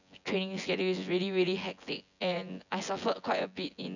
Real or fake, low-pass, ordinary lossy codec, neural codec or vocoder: fake; 7.2 kHz; none; vocoder, 24 kHz, 100 mel bands, Vocos